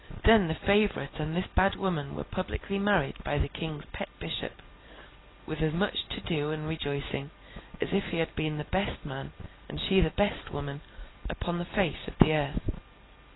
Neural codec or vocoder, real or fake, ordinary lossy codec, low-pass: none; real; AAC, 16 kbps; 7.2 kHz